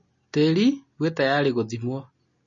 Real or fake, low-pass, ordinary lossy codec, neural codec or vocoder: real; 7.2 kHz; MP3, 32 kbps; none